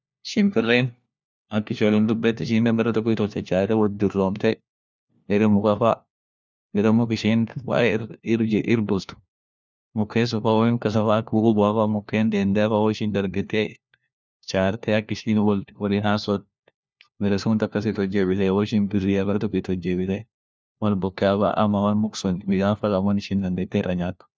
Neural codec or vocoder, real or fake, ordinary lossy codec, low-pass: codec, 16 kHz, 1 kbps, FunCodec, trained on LibriTTS, 50 frames a second; fake; none; none